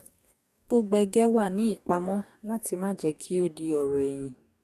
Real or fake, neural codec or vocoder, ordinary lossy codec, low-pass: fake; codec, 44.1 kHz, 2.6 kbps, DAC; none; 14.4 kHz